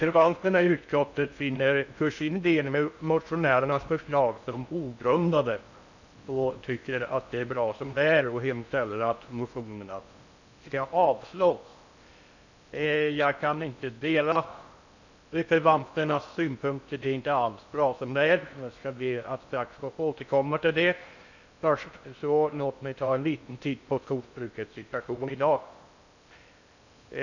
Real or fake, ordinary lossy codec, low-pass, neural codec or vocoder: fake; none; 7.2 kHz; codec, 16 kHz in and 24 kHz out, 0.6 kbps, FocalCodec, streaming, 4096 codes